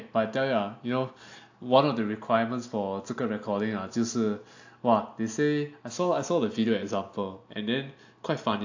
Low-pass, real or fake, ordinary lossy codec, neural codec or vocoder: 7.2 kHz; real; AAC, 48 kbps; none